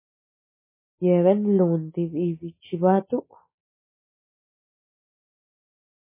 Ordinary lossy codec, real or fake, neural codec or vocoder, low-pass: MP3, 16 kbps; real; none; 3.6 kHz